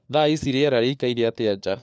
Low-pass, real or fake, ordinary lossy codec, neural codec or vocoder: none; fake; none; codec, 16 kHz, 4 kbps, FunCodec, trained on LibriTTS, 50 frames a second